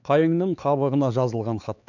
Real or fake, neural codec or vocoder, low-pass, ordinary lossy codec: fake; codec, 16 kHz, 4 kbps, X-Codec, HuBERT features, trained on LibriSpeech; 7.2 kHz; none